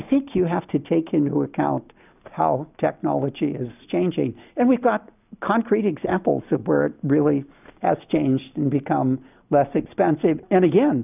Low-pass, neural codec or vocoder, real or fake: 3.6 kHz; none; real